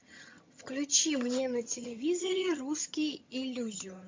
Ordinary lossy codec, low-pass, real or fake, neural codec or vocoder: MP3, 48 kbps; 7.2 kHz; fake; vocoder, 22.05 kHz, 80 mel bands, HiFi-GAN